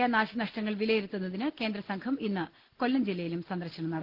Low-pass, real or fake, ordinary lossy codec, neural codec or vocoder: 5.4 kHz; real; Opus, 16 kbps; none